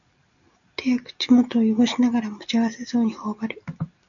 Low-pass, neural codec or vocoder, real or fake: 7.2 kHz; none; real